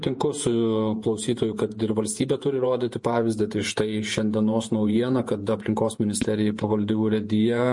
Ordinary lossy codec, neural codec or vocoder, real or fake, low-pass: MP3, 48 kbps; vocoder, 48 kHz, 128 mel bands, Vocos; fake; 10.8 kHz